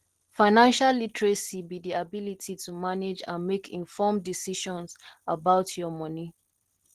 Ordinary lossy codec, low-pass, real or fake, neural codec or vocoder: Opus, 16 kbps; 14.4 kHz; real; none